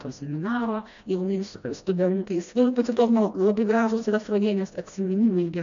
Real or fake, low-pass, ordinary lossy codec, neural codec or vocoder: fake; 7.2 kHz; AAC, 64 kbps; codec, 16 kHz, 1 kbps, FreqCodec, smaller model